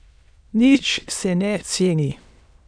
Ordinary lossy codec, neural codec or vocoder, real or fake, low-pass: none; autoencoder, 22.05 kHz, a latent of 192 numbers a frame, VITS, trained on many speakers; fake; 9.9 kHz